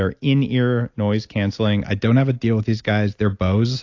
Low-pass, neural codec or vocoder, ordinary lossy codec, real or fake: 7.2 kHz; none; AAC, 48 kbps; real